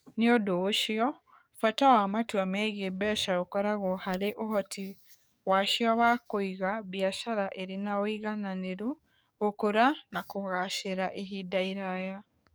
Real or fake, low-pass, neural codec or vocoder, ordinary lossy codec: fake; none; codec, 44.1 kHz, 7.8 kbps, DAC; none